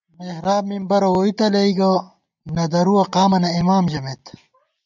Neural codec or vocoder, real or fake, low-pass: none; real; 7.2 kHz